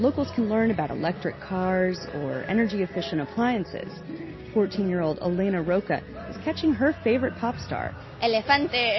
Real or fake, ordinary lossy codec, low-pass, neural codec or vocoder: real; MP3, 24 kbps; 7.2 kHz; none